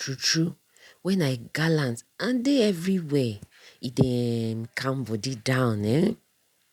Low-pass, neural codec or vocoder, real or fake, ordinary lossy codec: 19.8 kHz; none; real; none